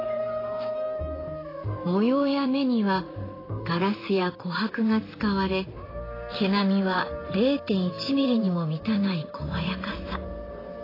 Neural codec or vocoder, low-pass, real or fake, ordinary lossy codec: vocoder, 44.1 kHz, 128 mel bands, Pupu-Vocoder; 5.4 kHz; fake; AAC, 24 kbps